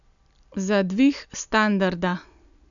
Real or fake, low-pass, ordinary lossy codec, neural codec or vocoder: real; 7.2 kHz; none; none